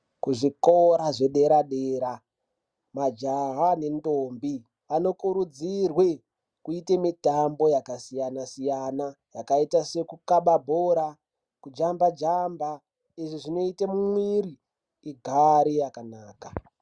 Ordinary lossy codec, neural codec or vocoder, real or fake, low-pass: Opus, 64 kbps; none; real; 9.9 kHz